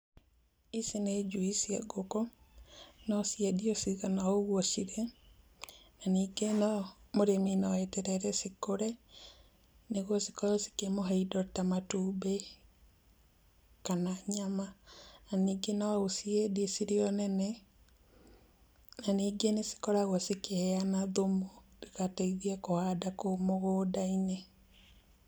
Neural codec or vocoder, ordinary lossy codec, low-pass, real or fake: none; none; none; real